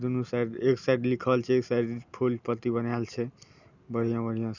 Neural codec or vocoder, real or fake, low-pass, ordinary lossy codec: none; real; 7.2 kHz; none